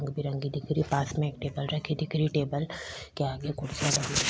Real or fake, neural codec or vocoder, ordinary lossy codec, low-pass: real; none; none; none